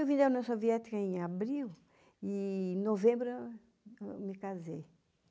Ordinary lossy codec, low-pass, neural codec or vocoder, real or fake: none; none; none; real